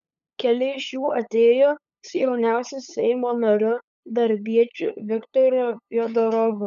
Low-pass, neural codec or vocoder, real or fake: 7.2 kHz; codec, 16 kHz, 8 kbps, FunCodec, trained on LibriTTS, 25 frames a second; fake